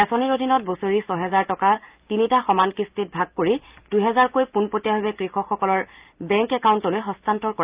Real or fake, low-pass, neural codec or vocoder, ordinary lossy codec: real; 3.6 kHz; none; Opus, 32 kbps